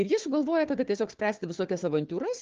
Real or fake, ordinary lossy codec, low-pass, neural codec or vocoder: fake; Opus, 16 kbps; 7.2 kHz; codec, 16 kHz, 4 kbps, FunCodec, trained on Chinese and English, 50 frames a second